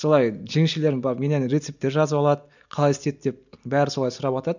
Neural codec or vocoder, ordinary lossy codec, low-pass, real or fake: none; none; 7.2 kHz; real